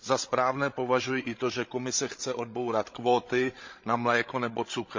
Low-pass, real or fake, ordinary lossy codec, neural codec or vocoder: 7.2 kHz; fake; MP3, 48 kbps; codec, 16 kHz, 16 kbps, FreqCodec, larger model